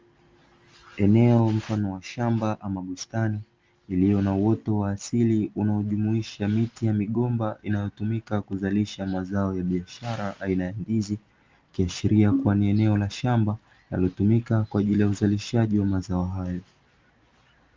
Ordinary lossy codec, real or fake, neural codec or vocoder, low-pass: Opus, 32 kbps; real; none; 7.2 kHz